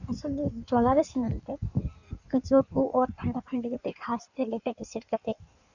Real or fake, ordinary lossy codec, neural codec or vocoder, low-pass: fake; none; codec, 16 kHz in and 24 kHz out, 1.1 kbps, FireRedTTS-2 codec; 7.2 kHz